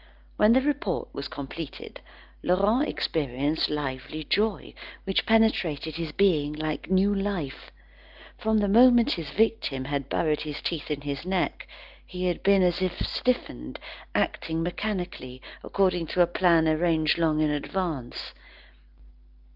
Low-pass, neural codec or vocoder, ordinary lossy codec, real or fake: 5.4 kHz; none; Opus, 32 kbps; real